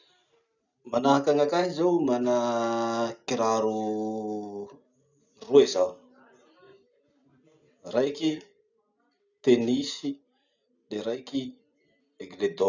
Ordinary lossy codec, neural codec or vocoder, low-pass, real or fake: none; none; 7.2 kHz; real